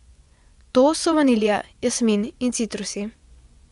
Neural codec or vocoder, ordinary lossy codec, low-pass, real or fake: vocoder, 24 kHz, 100 mel bands, Vocos; none; 10.8 kHz; fake